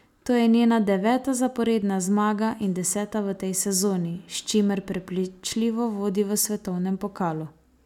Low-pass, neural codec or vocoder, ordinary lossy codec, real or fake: 19.8 kHz; none; none; real